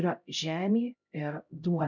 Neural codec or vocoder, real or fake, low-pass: codec, 16 kHz, 0.5 kbps, X-Codec, HuBERT features, trained on LibriSpeech; fake; 7.2 kHz